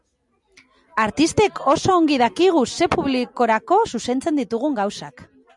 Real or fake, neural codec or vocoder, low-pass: real; none; 10.8 kHz